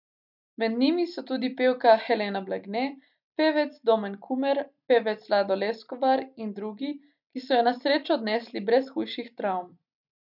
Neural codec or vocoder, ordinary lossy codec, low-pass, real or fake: none; none; 5.4 kHz; real